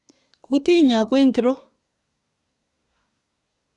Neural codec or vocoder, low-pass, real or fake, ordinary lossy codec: codec, 24 kHz, 1 kbps, SNAC; 10.8 kHz; fake; none